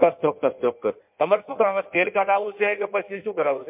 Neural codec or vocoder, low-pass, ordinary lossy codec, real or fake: codec, 16 kHz in and 24 kHz out, 1.1 kbps, FireRedTTS-2 codec; 3.6 kHz; MP3, 32 kbps; fake